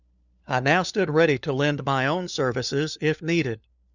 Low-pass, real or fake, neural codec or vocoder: 7.2 kHz; fake; codec, 16 kHz, 4 kbps, FunCodec, trained on LibriTTS, 50 frames a second